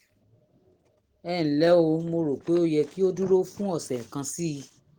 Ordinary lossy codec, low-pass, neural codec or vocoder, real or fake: Opus, 16 kbps; 19.8 kHz; none; real